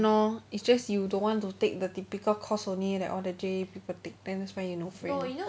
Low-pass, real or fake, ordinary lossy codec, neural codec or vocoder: none; real; none; none